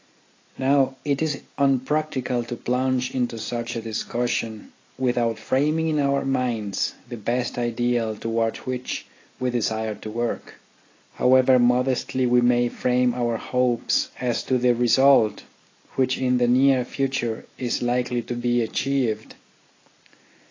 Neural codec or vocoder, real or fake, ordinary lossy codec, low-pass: none; real; AAC, 32 kbps; 7.2 kHz